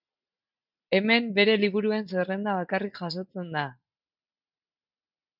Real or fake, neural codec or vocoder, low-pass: real; none; 5.4 kHz